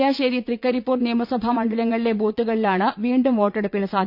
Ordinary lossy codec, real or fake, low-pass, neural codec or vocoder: none; fake; 5.4 kHz; vocoder, 44.1 kHz, 80 mel bands, Vocos